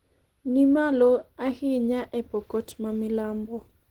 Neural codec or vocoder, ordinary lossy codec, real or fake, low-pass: none; Opus, 16 kbps; real; 19.8 kHz